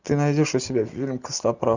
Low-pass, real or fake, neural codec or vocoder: 7.2 kHz; real; none